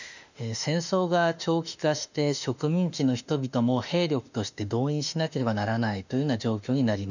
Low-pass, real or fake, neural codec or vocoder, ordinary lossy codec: 7.2 kHz; fake; autoencoder, 48 kHz, 32 numbers a frame, DAC-VAE, trained on Japanese speech; none